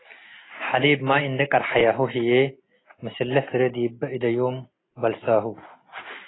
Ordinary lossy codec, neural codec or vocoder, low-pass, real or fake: AAC, 16 kbps; none; 7.2 kHz; real